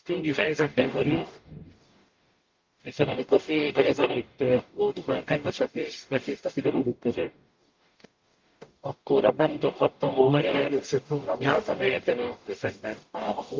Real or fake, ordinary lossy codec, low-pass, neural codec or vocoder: fake; Opus, 32 kbps; 7.2 kHz; codec, 44.1 kHz, 0.9 kbps, DAC